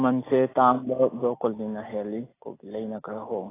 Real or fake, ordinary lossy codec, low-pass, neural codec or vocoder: real; AAC, 16 kbps; 3.6 kHz; none